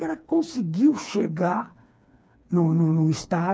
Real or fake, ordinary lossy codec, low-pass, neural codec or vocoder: fake; none; none; codec, 16 kHz, 4 kbps, FreqCodec, smaller model